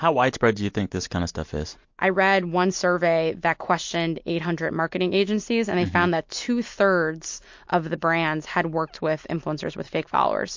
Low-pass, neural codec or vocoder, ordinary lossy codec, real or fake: 7.2 kHz; none; MP3, 48 kbps; real